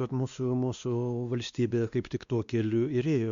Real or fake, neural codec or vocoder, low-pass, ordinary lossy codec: fake; codec, 16 kHz, 2 kbps, X-Codec, WavLM features, trained on Multilingual LibriSpeech; 7.2 kHz; Opus, 64 kbps